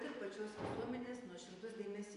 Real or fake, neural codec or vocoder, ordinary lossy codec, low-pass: real; none; MP3, 96 kbps; 10.8 kHz